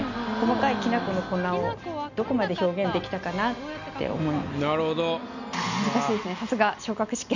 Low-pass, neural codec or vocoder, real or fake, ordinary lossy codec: 7.2 kHz; none; real; none